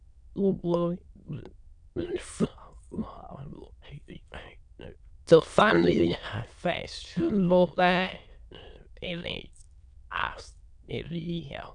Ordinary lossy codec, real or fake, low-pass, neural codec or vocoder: none; fake; 9.9 kHz; autoencoder, 22.05 kHz, a latent of 192 numbers a frame, VITS, trained on many speakers